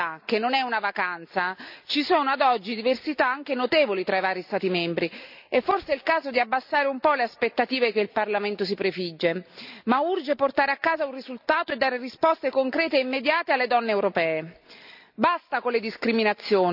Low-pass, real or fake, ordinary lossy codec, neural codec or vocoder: 5.4 kHz; real; none; none